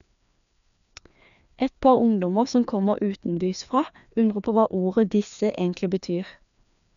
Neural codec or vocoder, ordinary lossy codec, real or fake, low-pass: codec, 16 kHz, 2 kbps, FreqCodec, larger model; none; fake; 7.2 kHz